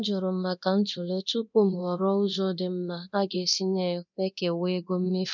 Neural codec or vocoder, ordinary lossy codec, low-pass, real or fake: codec, 24 kHz, 0.9 kbps, DualCodec; none; 7.2 kHz; fake